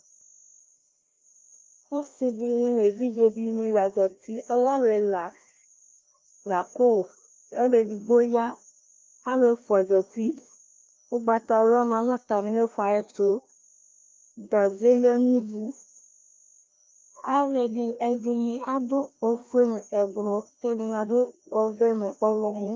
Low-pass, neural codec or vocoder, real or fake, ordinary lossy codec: 7.2 kHz; codec, 16 kHz, 1 kbps, FreqCodec, larger model; fake; Opus, 24 kbps